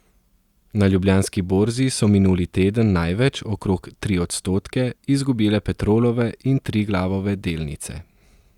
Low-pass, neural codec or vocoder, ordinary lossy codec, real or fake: 19.8 kHz; none; Opus, 64 kbps; real